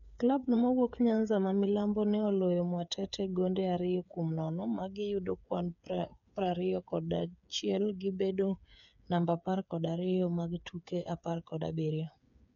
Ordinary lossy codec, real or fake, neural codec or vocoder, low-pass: none; fake; codec, 16 kHz, 8 kbps, FreqCodec, smaller model; 7.2 kHz